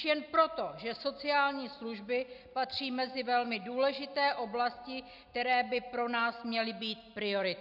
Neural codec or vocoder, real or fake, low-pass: none; real; 5.4 kHz